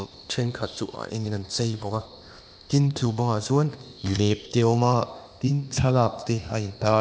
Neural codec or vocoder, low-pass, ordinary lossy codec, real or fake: codec, 16 kHz, 0.8 kbps, ZipCodec; none; none; fake